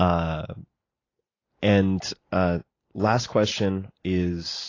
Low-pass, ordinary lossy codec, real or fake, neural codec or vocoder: 7.2 kHz; AAC, 32 kbps; real; none